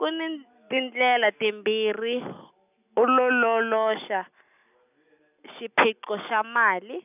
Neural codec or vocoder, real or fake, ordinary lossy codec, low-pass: none; real; none; 3.6 kHz